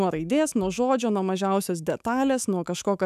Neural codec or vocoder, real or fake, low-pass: autoencoder, 48 kHz, 128 numbers a frame, DAC-VAE, trained on Japanese speech; fake; 14.4 kHz